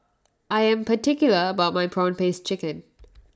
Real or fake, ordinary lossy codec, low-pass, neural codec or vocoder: real; none; none; none